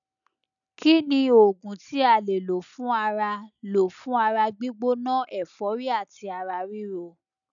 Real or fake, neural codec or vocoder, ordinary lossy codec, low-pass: real; none; none; 7.2 kHz